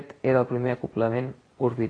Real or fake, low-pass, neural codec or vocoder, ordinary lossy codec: fake; 9.9 kHz; vocoder, 24 kHz, 100 mel bands, Vocos; AAC, 32 kbps